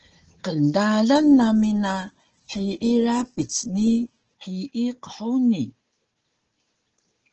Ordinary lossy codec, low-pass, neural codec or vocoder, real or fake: Opus, 16 kbps; 7.2 kHz; codec, 16 kHz, 4 kbps, FunCodec, trained on Chinese and English, 50 frames a second; fake